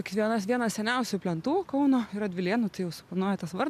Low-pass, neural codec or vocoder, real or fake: 14.4 kHz; none; real